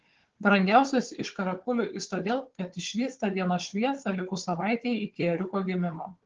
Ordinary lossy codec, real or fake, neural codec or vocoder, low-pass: Opus, 16 kbps; fake; codec, 16 kHz, 4 kbps, FunCodec, trained on Chinese and English, 50 frames a second; 7.2 kHz